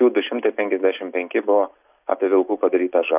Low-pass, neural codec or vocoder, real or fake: 3.6 kHz; none; real